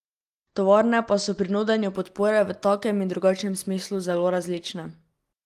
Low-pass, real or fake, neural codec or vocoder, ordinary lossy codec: 14.4 kHz; real; none; Opus, 24 kbps